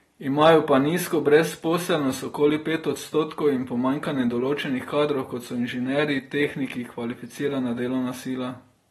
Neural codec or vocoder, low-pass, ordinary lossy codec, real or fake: none; 19.8 kHz; AAC, 32 kbps; real